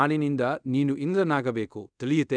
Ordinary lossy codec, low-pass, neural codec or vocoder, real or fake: none; 9.9 kHz; codec, 24 kHz, 0.5 kbps, DualCodec; fake